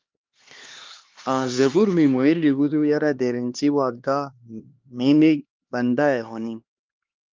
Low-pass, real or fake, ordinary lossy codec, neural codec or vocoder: 7.2 kHz; fake; Opus, 32 kbps; codec, 16 kHz, 2 kbps, X-Codec, HuBERT features, trained on LibriSpeech